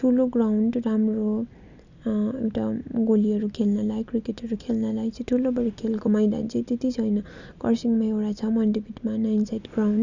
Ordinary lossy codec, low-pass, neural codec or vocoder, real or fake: none; 7.2 kHz; none; real